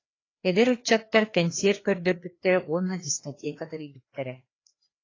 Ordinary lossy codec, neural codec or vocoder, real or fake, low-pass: AAC, 32 kbps; codec, 16 kHz, 2 kbps, FreqCodec, larger model; fake; 7.2 kHz